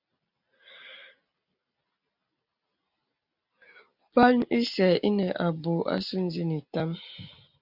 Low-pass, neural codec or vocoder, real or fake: 5.4 kHz; none; real